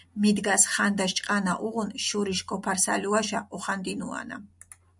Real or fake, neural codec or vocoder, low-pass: real; none; 10.8 kHz